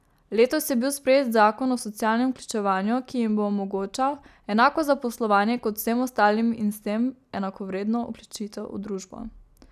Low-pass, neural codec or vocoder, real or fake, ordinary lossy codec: 14.4 kHz; none; real; none